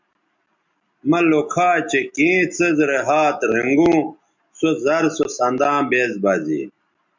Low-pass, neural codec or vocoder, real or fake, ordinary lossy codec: 7.2 kHz; none; real; MP3, 64 kbps